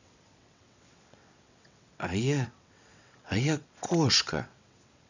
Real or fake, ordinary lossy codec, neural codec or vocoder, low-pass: fake; none; vocoder, 22.05 kHz, 80 mel bands, WaveNeXt; 7.2 kHz